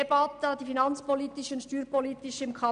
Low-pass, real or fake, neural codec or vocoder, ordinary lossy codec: 9.9 kHz; real; none; none